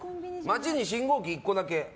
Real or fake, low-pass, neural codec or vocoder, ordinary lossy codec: real; none; none; none